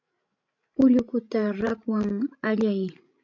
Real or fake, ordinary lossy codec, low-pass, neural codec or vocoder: fake; MP3, 64 kbps; 7.2 kHz; codec, 16 kHz, 16 kbps, FreqCodec, larger model